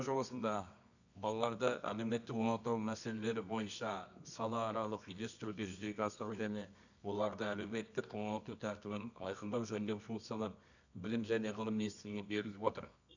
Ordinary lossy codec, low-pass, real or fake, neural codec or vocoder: none; 7.2 kHz; fake; codec, 24 kHz, 0.9 kbps, WavTokenizer, medium music audio release